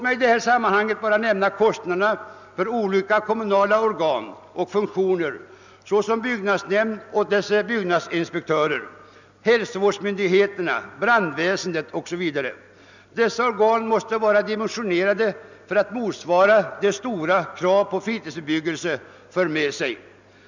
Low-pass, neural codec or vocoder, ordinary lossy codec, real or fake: 7.2 kHz; none; none; real